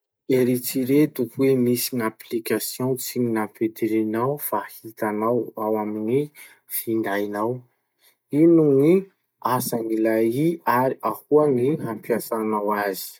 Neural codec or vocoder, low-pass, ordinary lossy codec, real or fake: none; none; none; real